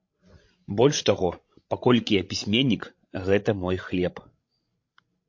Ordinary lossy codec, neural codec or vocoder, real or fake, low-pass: MP3, 48 kbps; codec, 16 kHz, 16 kbps, FreqCodec, larger model; fake; 7.2 kHz